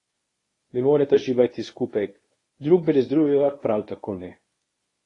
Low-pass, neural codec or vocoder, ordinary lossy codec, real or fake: 10.8 kHz; codec, 24 kHz, 0.9 kbps, WavTokenizer, medium speech release version 1; AAC, 32 kbps; fake